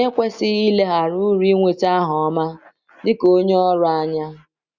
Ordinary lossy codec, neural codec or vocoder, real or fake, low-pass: Opus, 64 kbps; none; real; 7.2 kHz